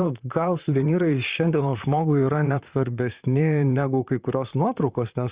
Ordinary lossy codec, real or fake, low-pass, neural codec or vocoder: Opus, 64 kbps; fake; 3.6 kHz; vocoder, 44.1 kHz, 128 mel bands, Pupu-Vocoder